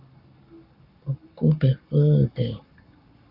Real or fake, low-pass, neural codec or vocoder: fake; 5.4 kHz; codec, 44.1 kHz, 7.8 kbps, Pupu-Codec